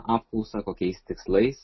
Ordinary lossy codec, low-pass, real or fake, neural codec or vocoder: MP3, 24 kbps; 7.2 kHz; real; none